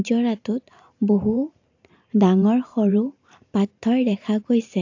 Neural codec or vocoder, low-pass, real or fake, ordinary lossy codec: vocoder, 44.1 kHz, 128 mel bands every 512 samples, BigVGAN v2; 7.2 kHz; fake; AAC, 48 kbps